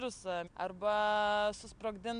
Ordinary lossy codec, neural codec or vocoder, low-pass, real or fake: MP3, 64 kbps; none; 9.9 kHz; real